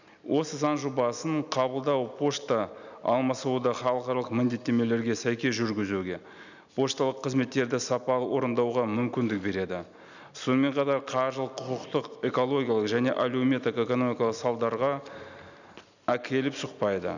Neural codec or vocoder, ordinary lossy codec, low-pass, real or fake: none; none; 7.2 kHz; real